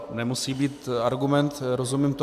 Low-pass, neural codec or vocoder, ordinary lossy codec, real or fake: 14.4 kHz; none; AAC, 96 kbps; real